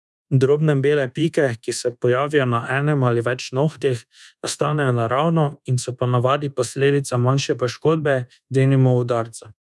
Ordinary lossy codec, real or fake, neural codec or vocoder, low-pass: none; fake; codec, 24 kHz, 1.2 kbps, DualCodec; none